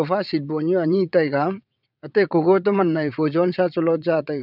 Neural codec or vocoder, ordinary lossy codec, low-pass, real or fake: vocoder, 22.05 kHz, 80 mel bands, WaveNeXt; none; 5.4 kHz; fake